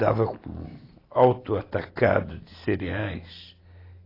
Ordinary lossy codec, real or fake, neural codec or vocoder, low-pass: AAC, 24 kbps; real; none; 5.4 kHz